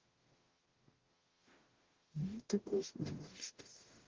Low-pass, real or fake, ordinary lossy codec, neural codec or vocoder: 7.2 kHz; fake; Opus, 16 kbps; codec, 44.1 kHz, 0.9 kbps, DAC